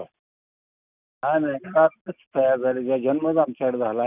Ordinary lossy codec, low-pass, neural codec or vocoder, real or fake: none; 3.6 kHz; none; real